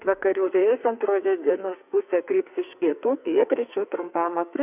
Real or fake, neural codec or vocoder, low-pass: fake; codec, 44.1 kHz, 2.6 kbps, SNAC; 3.6 kHz